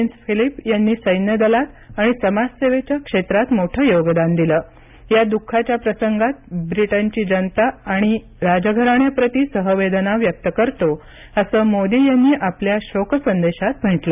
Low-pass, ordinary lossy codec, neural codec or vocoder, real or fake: 3.6 kHz; none; none; real